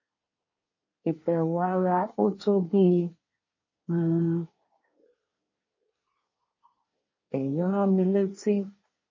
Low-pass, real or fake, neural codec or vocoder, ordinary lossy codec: 7.2 kHz; fake; codec, 24 kHz, 1 kbps, SNAC; MP3, 32 kbps